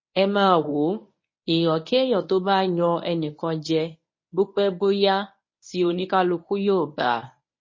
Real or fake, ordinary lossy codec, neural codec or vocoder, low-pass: fake; MP3, 32 kbps; codec, 24 kHz, 0.9 kbps, WavTokenizer, medium speech release version 1; 7.2 kHz